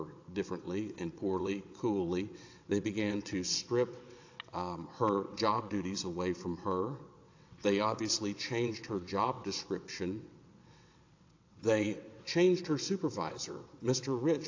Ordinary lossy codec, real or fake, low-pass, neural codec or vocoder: AAC, 48 kbps; fake; 7.2 kHz; vocoder, 22.05 kHz, 80 mel bands, WaveNeXt